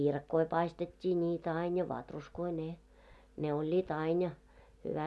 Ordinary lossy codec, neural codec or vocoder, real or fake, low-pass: none; none; real; none